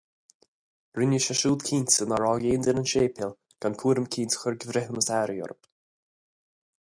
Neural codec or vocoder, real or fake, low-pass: none; real; 9.9 kHz